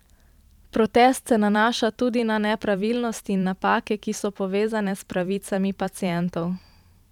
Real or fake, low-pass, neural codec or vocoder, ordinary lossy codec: fake; 19.8 kHz; vocoder, 44.1 kHz, 128 mel bands every 512 samples, BigVGAN v2; none